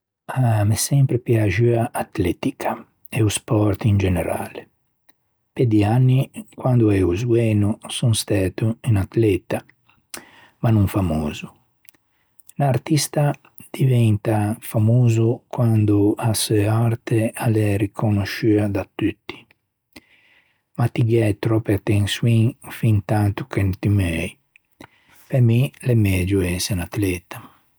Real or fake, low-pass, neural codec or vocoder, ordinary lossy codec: real; none; none; none